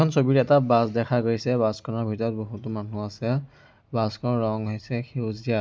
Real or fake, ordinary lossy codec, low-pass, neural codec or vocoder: real; none; none; none